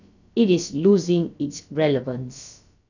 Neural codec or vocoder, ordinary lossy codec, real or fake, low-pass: codec, 16 kHz, about 1 kbps, DyCAST, with the encoder's durations; none; fake; 7.2 kHz